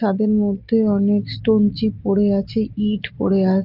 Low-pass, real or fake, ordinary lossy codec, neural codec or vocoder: 5.4 kHz; real; Opus, 32 kbps; none